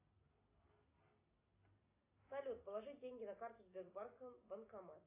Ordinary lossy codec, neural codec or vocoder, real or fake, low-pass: AAC, 24 kbps; none; real; 3.6 kHz